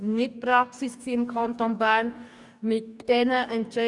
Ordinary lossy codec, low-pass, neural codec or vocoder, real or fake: none; 10.8 kHz; codec, 44.1 kHz, 2.6 kbps, DAC; fake